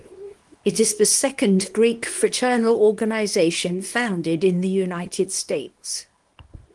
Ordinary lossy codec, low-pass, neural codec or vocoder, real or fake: Opus, 24 kbps; 10.8 kHz; codec, 24 kHz, 0.9 kbps, WavTokenizer, small release; fake